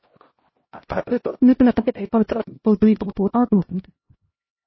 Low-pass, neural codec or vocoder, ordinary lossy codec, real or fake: 7.2 kHz; codec, 16 kHz, 0.5 kbps, X-Codec, HuBERT features, trained on LibriSpeech; MP3, 24 kbps; fake